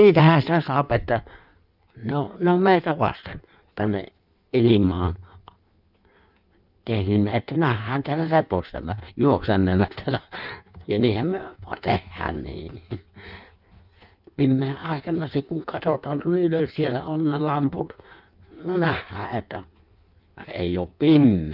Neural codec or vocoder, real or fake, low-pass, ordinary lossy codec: codec, 16 kHz in and 24 kHz out, 1.1 kbps, FireRedTTS-2 codec; fake; 5.4 kHz; none